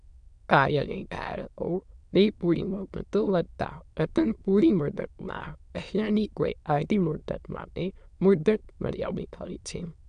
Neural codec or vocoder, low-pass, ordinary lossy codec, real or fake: autoencoder, 22.05 kHz, a latent of 192 numbers a frame, VITS, trained on many speakers; 9.9 kHz; AAC, 96 kbps; fake